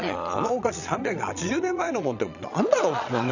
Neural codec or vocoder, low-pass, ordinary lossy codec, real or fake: vocoder, 22.05 kHz, 80 mel bands, Vocos; 7.2 kHz; none; fake